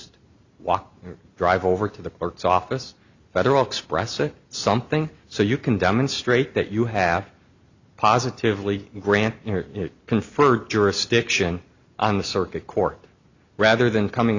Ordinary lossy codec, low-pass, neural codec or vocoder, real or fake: Opus, 64 kbps; 7.2 kHz; none; real